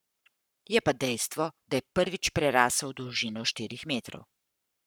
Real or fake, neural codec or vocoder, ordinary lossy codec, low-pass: fake; codec, 44.1 kHz, 7.8 kbps, Pupu-Codec; none; none